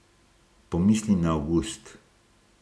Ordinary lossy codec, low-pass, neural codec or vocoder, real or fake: none; none; none; real